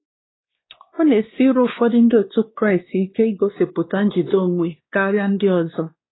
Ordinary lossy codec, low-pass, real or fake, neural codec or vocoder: AAC, 16 kbps; 7.2 kHz; fake; codec, 16 kHz, 2 kbps, X-Codec, WavLM features, trained on Multilingual LibriSpeech